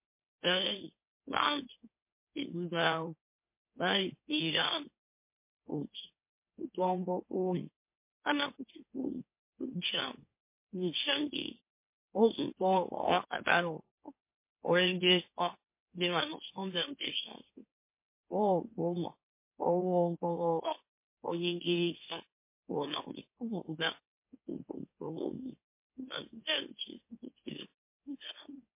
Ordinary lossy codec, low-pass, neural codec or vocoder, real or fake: MP3, 24 kbps; 3.6 kHz; autoencoder, 44.1 kHz, a latent of 192 numbers a frame, MeloTTS; fake